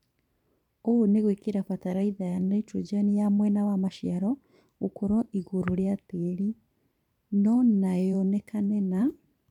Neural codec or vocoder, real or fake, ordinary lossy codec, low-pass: vocoder, 44.1 kHz, 128 mel bands every 512 samples, BigVGAN v2; fake; none; 19.8 kHz